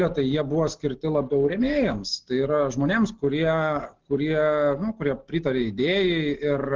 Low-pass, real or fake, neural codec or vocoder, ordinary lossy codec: 7.2 kHz; real; none; Opus, 16 kbps